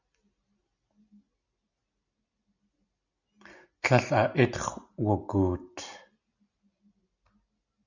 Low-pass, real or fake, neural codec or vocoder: 7.2 kHz; real; none